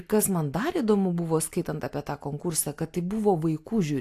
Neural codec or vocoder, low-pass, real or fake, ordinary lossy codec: none; 14.4 kHz; real; AAC, 64 kbps